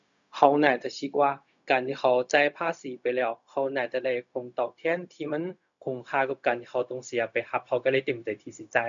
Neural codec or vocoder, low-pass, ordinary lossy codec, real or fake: codec, 16 kHz, 0.4 kbps, LongCat-Audio-Codec; 7.2 kHz; none; fake